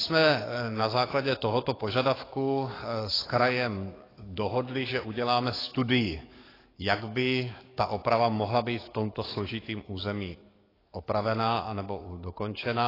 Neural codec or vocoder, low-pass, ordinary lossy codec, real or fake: codec, 44.1 kHz, 7.8 kbps, DAC; 5.4 kHz; AAC, 24 kbps; fake